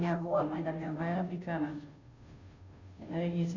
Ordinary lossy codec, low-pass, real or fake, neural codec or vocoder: none; 7.2 kHz; fake; codec, 16 kHz, 0.5 kbps, FunCodec, trained on Chinese and English, 25 frames a second